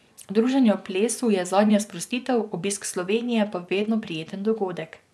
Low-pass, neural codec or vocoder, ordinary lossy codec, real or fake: none; vocoder, 24 kHz, 100 mel bands, Vocos; none; fake